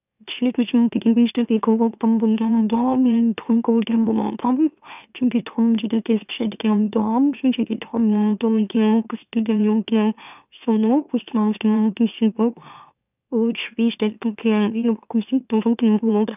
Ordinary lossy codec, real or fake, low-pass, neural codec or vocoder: none; fake; 3.6 kHz; autoencoder, 44.1 kHz, a latent of 192 numbers a frame, MeloTTS